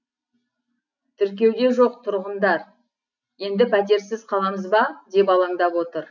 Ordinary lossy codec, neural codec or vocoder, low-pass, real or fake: none; none; 7.2 kHz; real